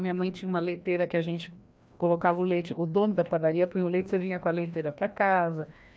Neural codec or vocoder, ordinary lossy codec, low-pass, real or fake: codec, 16 kHz, 1 kbps, FreqCodec, larger model; none; none; fake